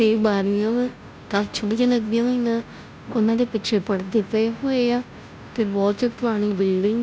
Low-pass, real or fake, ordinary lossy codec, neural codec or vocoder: none; fake; none; codec, 16 kHz, 0.5 kbps, FunCodec, trained on Chinese and English, 25 frames a second